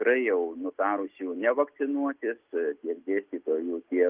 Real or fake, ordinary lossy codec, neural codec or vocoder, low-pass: real; Opus, 24 kbps; none; 3.6 kHz